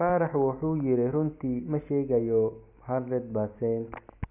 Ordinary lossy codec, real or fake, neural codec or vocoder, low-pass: none; real; none; 3.6 kHz